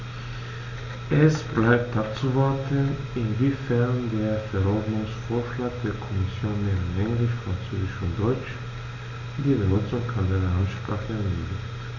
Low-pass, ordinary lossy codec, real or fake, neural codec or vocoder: 7.2 kHz; none; real; none